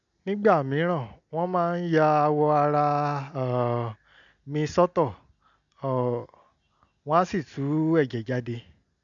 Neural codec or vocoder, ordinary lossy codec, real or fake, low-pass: none; none; real; 7.2 kHz